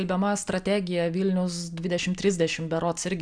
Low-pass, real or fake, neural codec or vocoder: 9.9 kHz; real; none